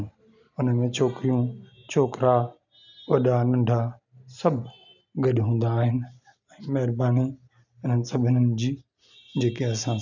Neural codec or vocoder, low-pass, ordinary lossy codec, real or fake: none; 7.2 kHz; none; real